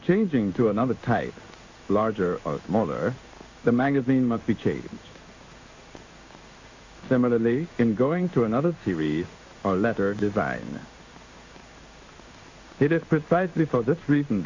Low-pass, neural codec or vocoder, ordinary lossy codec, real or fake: 7.2 kHz; codec, 16 kHz in and 24 kHz out, 1 kbps, XY-Tokenizer; AAC, 48 kbps; fake